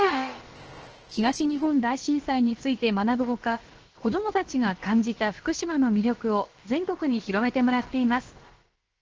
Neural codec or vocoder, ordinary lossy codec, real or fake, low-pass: codec, 16 kHz, about 1 kbps, DyCAST, with the encoder's durations; Opus, 16 kbps; fake; 7.2 kHz